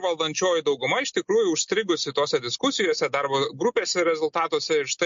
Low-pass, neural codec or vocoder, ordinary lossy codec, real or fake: 7.2 kHz; none; MP3, 48 kbps; real